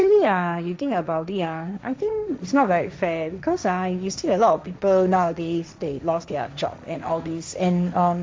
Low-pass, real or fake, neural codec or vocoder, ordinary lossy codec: none; fake; codec, 16 kHz, 1.1 kbps, Voila-Tokenizer; none